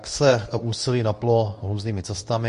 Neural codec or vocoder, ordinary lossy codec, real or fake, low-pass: codec, 24 kHz, 0.9 kbps, WavTokenizer, medium speech release version 2; MP3, 48 kbps; fake; 10.8 kHz